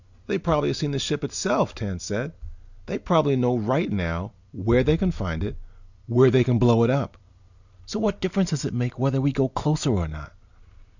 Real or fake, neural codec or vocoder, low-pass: real; none; 7.2 kHz